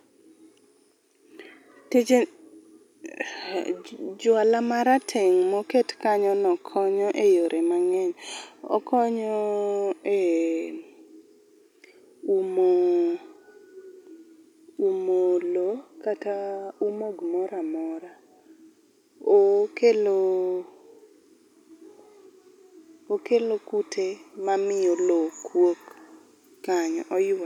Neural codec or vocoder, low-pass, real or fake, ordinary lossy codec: none; 19.8 kHz; real; none